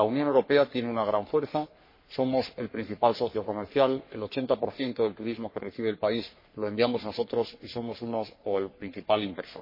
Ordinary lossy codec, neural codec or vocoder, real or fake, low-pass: MP3, 24 kbps; codec, 44.1 kHz, 3.4 kbps, Pupu-Codec; fake; 5.4 kHz